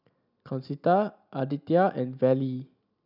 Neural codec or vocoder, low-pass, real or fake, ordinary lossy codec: none; 5.4 kHz; real; none